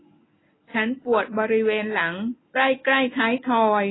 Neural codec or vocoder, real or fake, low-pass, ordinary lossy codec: none; real; 7.2 kHz; AAC, 16 kbps